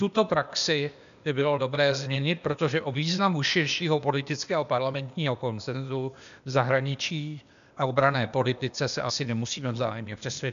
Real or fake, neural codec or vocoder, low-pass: fake; codec, 16 kHz, 0.8 kbps, ZipCodec; 7.2 kHz